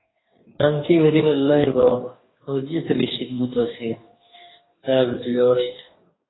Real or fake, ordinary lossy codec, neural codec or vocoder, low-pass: fake; AAC, 16 kbps; codec, 44.1 kHz, 2.6 kbps, DAC; 7.2 kHz